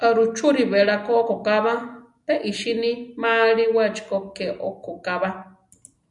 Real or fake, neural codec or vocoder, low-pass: real; none; 10.8 kHz